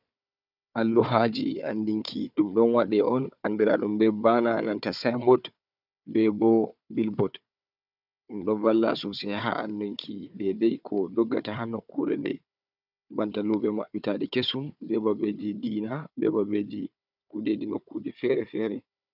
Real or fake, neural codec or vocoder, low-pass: fake; codec, 16 kHz, 4 kbps, FunCodec, trained on Chinese and English, 50 frames a second; 5.4 kHz